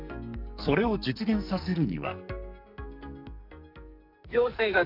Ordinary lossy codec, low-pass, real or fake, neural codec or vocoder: none; 5.4 kHz; fake; codec, 44.1 kHz, 2.6 kbps, SNAC